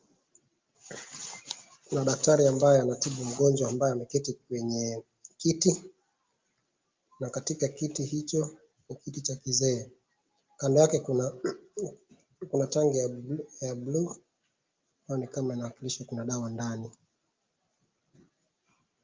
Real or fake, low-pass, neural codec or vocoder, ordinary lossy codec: real; 7.2 kHz; none; Opus, 24 kbps